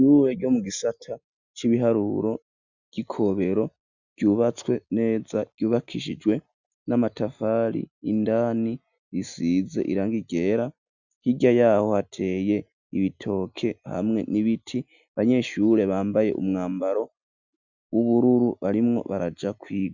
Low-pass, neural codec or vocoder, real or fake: 7.2 kHz; none; real